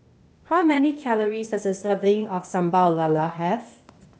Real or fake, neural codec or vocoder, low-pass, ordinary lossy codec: fake; codec, 16 kHz, 0.8 kbps, ZipCodec; none; none